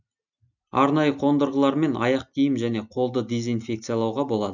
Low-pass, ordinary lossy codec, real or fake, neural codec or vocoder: 7.2 kHz; none; real; none